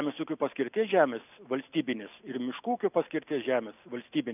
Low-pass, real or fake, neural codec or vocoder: 3.6 kHz; real; none